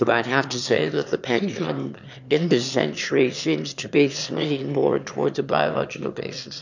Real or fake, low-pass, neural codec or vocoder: fake; 7.2 kHz; autoencoder, 22.05 kHz, a latent of 192 numbers a frame, VITS, trained on one speaker